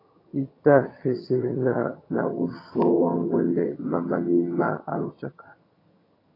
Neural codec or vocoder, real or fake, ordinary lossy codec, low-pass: vocoder, 22.05 kHz, 80 mel bands, HiFi-GAN; fake; AAC, 24 kbps; 5.4 kHz